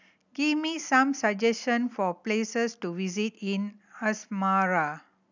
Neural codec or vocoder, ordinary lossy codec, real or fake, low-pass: none; none; real; 7.2 kHz